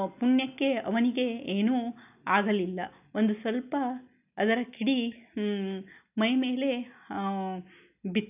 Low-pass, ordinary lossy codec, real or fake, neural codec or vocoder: 3.6 kHz; none; real; none